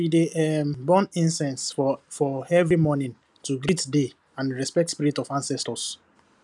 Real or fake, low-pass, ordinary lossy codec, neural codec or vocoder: real; 10.8 kHz; none; none